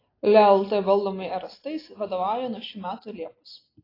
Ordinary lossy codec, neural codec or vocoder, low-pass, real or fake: AAC, 24 kbps; none; 5.4 kHz; real